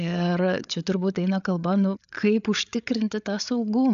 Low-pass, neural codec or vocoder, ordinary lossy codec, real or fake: 7.2 kHz; codec, 16 kHz, 8 kbps, FreqCodec, larger model; AAC, 96 kbps; fake